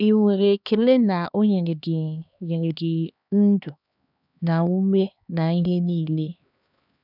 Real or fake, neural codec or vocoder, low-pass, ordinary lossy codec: fake; codec, 16 kHz, 2 kbps, X-Codec, HuBERT features, trained on LibriSpeech; 5.4 kHz; none